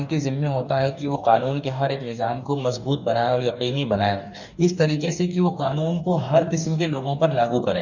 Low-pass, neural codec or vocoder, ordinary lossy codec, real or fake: 7.2 kHz; codec, 44.1 kHz, 2.6 kbps, DAC; none; fake